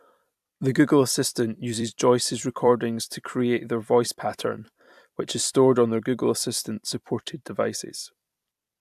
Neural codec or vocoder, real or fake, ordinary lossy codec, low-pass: vocoder, 44.1 kHz, 128 mel bands every 256 samples, BigVGAN v2; fake; AAC, 96 kbps; 14.4 kHz